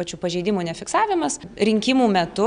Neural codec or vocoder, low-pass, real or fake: none; 9.9 kHz; real